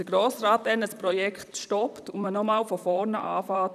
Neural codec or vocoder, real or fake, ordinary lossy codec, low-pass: vocoder, 44.1 kHz, 128 mel bands, Pupu-Vocoder; fake; none; 14.4 kHz